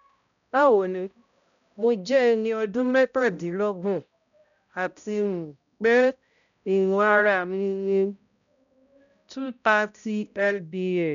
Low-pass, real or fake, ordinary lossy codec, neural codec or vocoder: 7.2 kHz; fake; none; codec, 16 kHz, 0.5 kbps, X-Codec, HuBERT features, trained on balanced general audio